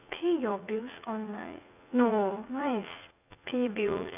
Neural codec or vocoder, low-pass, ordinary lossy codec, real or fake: vocoder, 44.1 kHz, 80 mel bands, Vocos; 3.6 kHz; none; fake